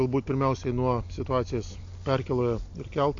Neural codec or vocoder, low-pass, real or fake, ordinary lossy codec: none; 7.2 kHz; real; AAC, 48 kbps